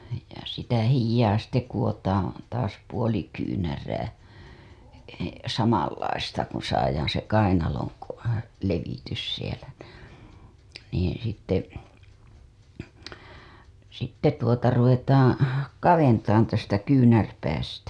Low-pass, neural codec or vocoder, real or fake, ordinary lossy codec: none; none; real; none